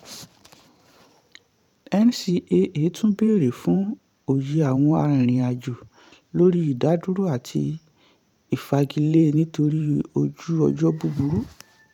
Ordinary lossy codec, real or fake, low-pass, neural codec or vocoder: none; fake; 19.8 kHz; vocoder, 44.1 kHz, 128 mel bands every 512 samples, BigVGAN v2